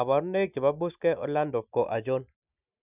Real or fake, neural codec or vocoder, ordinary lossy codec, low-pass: real; none; none; 3.6 kHz